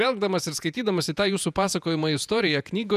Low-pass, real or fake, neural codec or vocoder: 14.4 kHz; fake; vocoder, 48 kHz, 128 mel bands, Vocos